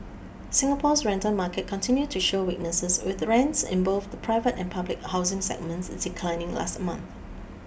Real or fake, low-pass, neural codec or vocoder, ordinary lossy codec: real; none; none; none